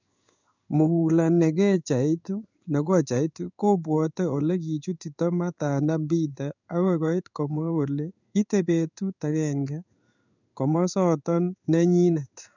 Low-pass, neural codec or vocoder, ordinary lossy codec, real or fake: 7.2 kHz; codec, 16 kHz in and 24 kHz out, 1 kbps, XY-Tokenizer; none; fake